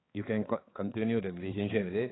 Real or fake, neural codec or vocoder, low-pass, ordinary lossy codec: fake; codec, 16 kHz, 4 kbps, X-Codec, HuBERT features, trained on balanced general audio; 7.2 kHz; AAC, 16 kbps